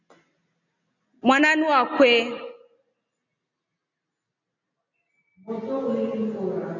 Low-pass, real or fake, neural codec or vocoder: 7.2 kHz; real; none